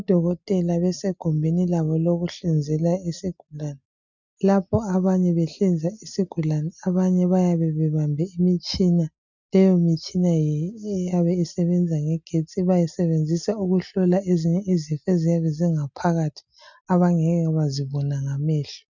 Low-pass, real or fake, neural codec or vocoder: 7.2 kHz; real; none